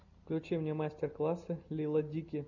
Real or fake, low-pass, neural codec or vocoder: real; 7.2 kHz; none